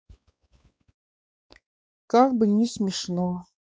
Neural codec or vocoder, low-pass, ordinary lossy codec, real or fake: codec, 16 kHz, 4 kbps, X-Codec, WavLM features, trained on Multilingual LibriSpeech; none; none; fake